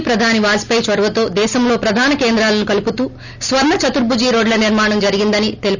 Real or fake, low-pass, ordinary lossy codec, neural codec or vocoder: real; 7.2 kHz; none; none